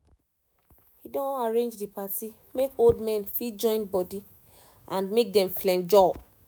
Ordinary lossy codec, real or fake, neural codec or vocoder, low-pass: none; fake; autoencoder, 48 kHz, 128 numbers a frame, DAC-VAE, trained on Japanese speech; none